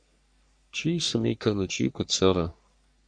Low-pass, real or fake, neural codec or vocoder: 9.9 kHz; fake; codec, 44.1 kHz, 3.4 kbps, Pupu-Codec